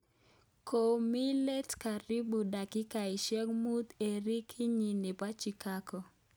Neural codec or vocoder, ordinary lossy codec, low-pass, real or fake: none; none; none; real